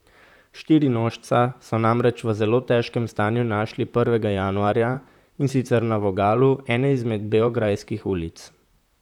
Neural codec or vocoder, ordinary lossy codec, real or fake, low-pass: vocoder, 44.1 kHz, 128 mel bands, Pupu-Vocoder; none; fake; 19.8 kHz